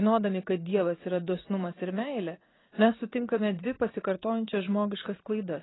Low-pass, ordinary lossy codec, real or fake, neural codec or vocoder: 7.2 kHz; AAC, 16 kbps; fake; vocoder, 44.1 kHz, 80 mel bands, Vocos